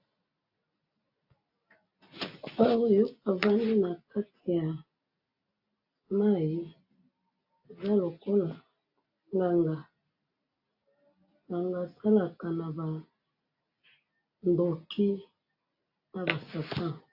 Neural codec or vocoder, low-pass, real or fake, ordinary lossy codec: none; 5.4 kHz; real; AAC, 48 kbps